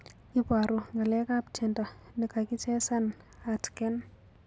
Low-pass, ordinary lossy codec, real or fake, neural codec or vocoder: none; none; real; none